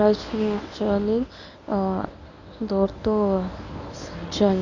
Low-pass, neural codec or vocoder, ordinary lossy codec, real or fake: 7.2 kHz; codec, 24 kHz, 0.9 kbps, WavTokenizer, medium speech release version 1; none; fake